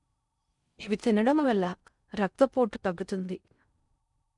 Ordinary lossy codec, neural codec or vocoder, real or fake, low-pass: none; codec, 16 kHz in and 24 kHz out, 0.6 kbps, FocalCodec, streaming, 4096 codes; fake; 10.8 kHz